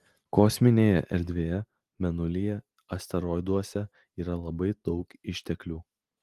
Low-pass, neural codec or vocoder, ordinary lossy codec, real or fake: 14.4 kHz; none; Opus, 24 kbps; real